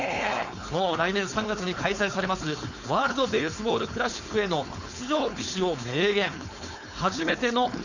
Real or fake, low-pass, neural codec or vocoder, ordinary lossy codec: fake; 7.2 kHz; codec, 16 kHz, 4.8 kbps, FACodec; none